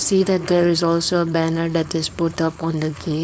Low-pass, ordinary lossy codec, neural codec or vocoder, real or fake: none; none; codec, 16 kHz, 4.8 kbps, FACodec; fake